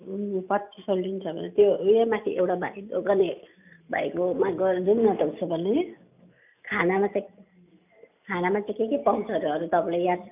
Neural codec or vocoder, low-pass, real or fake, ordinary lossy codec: none; 3.6 kHz; real; none